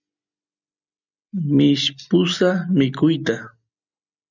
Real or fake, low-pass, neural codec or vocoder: real; 7.2 kHz; none